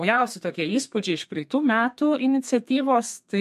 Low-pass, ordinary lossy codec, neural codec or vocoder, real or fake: 14.4 kHz; MP3, 64 kbps; codec, 32 kHz, 1.9 kbps, SNAC; fake